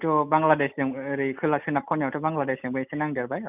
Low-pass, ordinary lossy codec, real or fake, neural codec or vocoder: 3.6 kHz; none; real; none